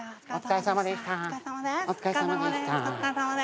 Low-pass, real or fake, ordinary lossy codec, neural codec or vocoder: none; real; none; none